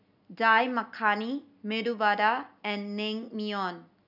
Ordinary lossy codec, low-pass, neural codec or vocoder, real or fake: none; 5.4 kHz; none; real